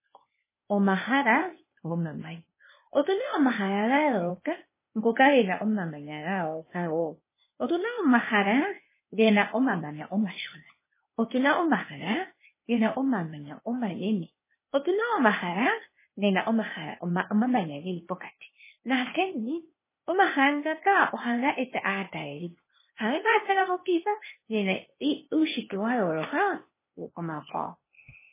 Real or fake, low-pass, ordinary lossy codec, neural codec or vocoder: fake; 3.6 kHz; MP3, 16 kbps; codec, 16 kHz, 0.8 kbps, ZipCodec